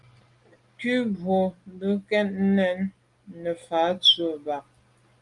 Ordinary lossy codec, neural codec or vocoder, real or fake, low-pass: Opus, 32 kbps; none; real; 10.8 kHz